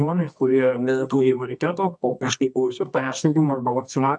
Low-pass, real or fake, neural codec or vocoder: 10.8 kHz; fake; codec, 24 kHz, 0.9 kbps, WavTokenizer, medium music audio release